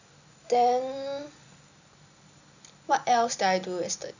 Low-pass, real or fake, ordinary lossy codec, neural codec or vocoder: 7.2 kHz; real; none; none